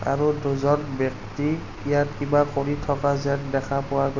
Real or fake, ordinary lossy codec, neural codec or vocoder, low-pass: real; none; none; 7.2 kHz